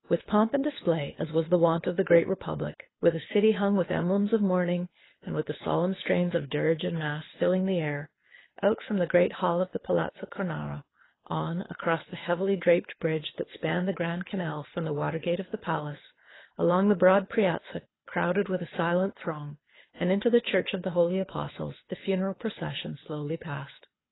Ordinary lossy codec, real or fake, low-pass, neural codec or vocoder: AAC, 16 kbps; fake; 7.2 kHz; codec, 24 kHz, 6 kbps, HILCodec